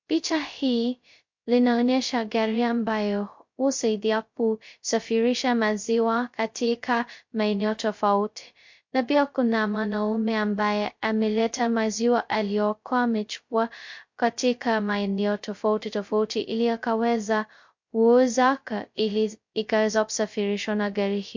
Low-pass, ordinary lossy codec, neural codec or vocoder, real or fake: 7.2 kHz; MP3, 48 kbps; codec, 16 kHz, 0.2 kbps, FocalCodec; fake